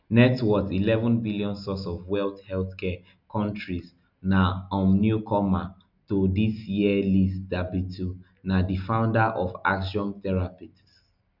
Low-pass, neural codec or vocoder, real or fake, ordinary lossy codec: 5.4 kHz; none; real; none